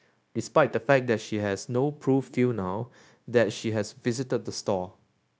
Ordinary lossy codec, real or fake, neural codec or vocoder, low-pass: none; fake; codec, 16 kHz, 0.9 kbps, LongCat-Audio-Codec; none